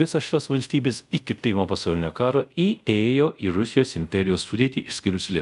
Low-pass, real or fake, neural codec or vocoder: 10.8 kHz; fake; codec, 24 kHz, 0.5 kbps, DualCodec